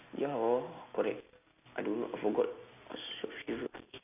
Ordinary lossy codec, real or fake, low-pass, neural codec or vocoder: none; real; 3.6 kHz; none